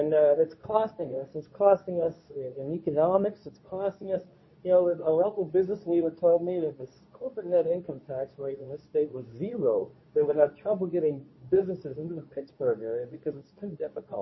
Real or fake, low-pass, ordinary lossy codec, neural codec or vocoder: fake; 7.2 kHz; MP3, 24 kbps; codec, 24 kHz, 0.9 kbps, WavTokenizer, medium speech release version 2